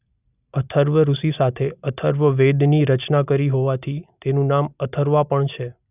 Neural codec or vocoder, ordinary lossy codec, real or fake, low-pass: none; none; real; 3.6 kHz